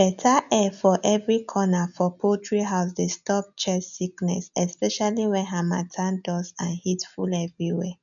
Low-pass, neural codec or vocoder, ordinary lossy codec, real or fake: 7.2 kHz; none; none; real